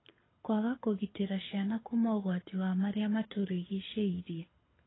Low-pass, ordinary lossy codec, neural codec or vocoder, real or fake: 7.2 kHz; AAC, 16 kbps; codec, 24 kHz, 6 kbps, HILCodec; fake